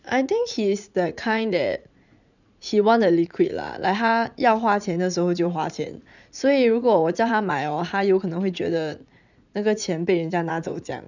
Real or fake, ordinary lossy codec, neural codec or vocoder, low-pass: fake; none; vocoder, 44.1 kHz, 80 mel bands, Vocos; 7.2 kHz